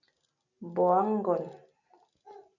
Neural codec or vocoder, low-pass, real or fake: none; 7.2 kHz; real